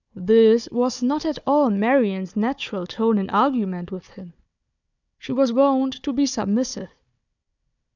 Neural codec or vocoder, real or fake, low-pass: codec, 16 kHz, 4 kbps, FunCodec, trained on Chinese and English, 50 frames a second; fake; 7.2 kHz